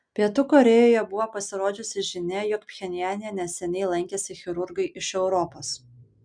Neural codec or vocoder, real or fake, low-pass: none; real; 9.9 kHz